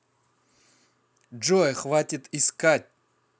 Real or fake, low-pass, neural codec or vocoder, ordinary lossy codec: real; none; none; none